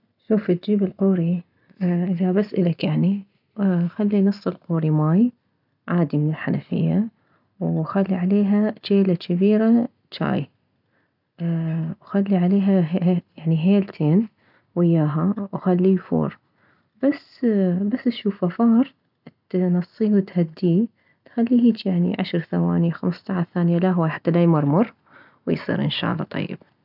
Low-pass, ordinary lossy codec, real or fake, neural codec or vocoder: 5.4 kHz; none; real; none